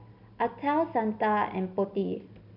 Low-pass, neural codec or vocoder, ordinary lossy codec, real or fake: 5.4 kHz; none; none; real